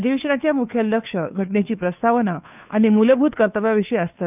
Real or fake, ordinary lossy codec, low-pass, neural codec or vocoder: fake; none; 3.6 kHz; codec, 16 kHz, 2 kbps, FunCodec, trained on Chinese and English, 25 frames a second